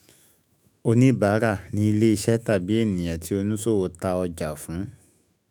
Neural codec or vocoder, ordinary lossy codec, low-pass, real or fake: autoencoder, 48 kHz, 128 numbers a frame, DAC-VAE, trained on Japanese speech; none; none; fake